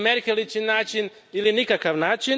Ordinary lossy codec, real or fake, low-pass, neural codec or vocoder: none; real; none; none